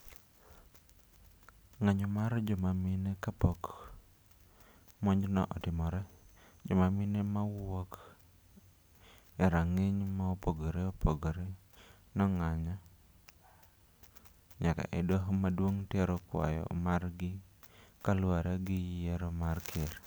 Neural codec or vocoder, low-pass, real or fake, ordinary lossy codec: none; none; real; none